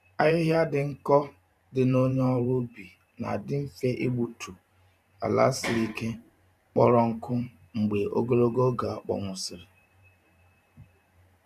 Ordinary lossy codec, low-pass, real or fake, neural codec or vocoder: none; 14.4 kHz; fake; vocoder, 44.1 kHz, 128 mel bands every 512 samples, BigVGAN v2